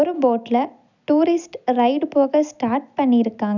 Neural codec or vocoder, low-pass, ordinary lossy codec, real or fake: none; 7.2 kHz; none; real